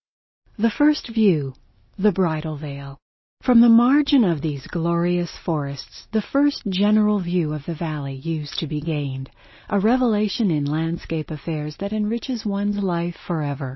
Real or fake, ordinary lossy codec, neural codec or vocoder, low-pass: real; MP3, 24 kbps; none; 7.2 kHz